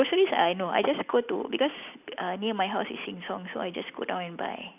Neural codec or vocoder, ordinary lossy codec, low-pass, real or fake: none; none; 3.6 kHz; real